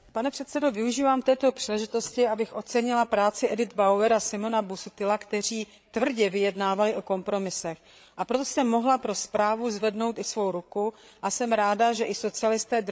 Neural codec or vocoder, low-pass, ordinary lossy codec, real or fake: codec, 16 kHz, 8 kbps, FreqCodec, larger model; none; none; fake